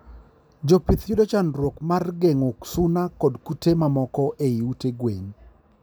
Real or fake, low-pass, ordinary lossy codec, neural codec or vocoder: real; none; none; none